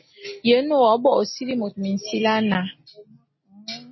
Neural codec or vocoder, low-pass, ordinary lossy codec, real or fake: none; 7.2 kHz; MP3, 24 kbps; real